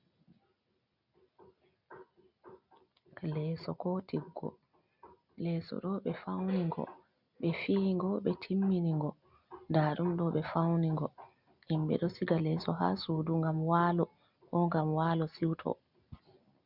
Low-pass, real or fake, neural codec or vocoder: 5.4 kHz; real; none